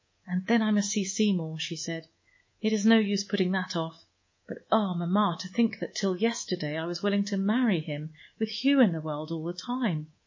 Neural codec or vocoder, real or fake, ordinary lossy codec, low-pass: codec, 24 kHz, 3.1 kbps, DualCodec; fake; MP3, 32 kbps; 7.2 kHz